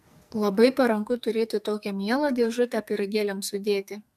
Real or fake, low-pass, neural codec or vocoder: fake; 14.4 kHz; codec, 44.1 kHz, 2.6 kbps, SNAC